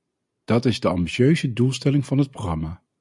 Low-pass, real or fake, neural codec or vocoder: 10.8 kHz; real; none